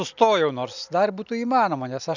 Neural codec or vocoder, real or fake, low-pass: none; real; 7.2 kHz